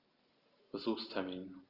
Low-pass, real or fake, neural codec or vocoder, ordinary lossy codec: 5.4 kHz; real; none; Opus, 24 kbps